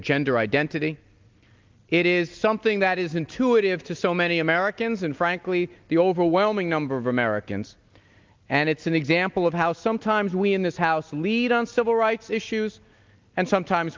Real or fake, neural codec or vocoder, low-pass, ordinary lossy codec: real; none; 7.2 kHz; Opus, 24 kbps